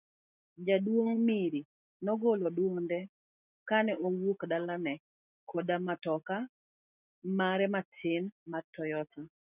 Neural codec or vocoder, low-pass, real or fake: none; 3.6 kHz; real